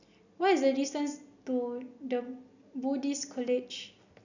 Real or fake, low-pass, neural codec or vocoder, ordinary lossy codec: real; 7.2 kHz; none; none